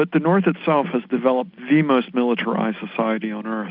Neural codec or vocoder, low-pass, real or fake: none; 5.4 kHz; real